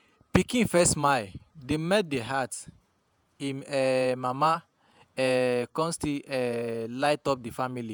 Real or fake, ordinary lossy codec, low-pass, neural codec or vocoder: fake; none; none; vocoder, 48 kHz, 128 mel bands, Vocos